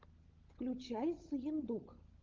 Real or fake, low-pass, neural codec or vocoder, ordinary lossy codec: fake; 7.2 kHz; codec, 16 kHz, 16 kbps, FunCodec, trained on LibriTTS, 50 frames a second; Opus, 32 kbps